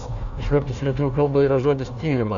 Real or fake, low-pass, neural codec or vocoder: fake; 7.2 kHz; codec, 16 kHz, 1 kbps, FunCodec, trained on Chinese and English, 50 frames a second